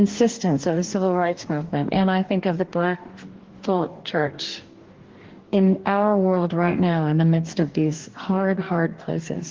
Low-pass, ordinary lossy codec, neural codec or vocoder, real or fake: 7.2 kHz; Opus, 16 kbps; codec, 44.1 kHz, 2.6 kbps, DAC; fake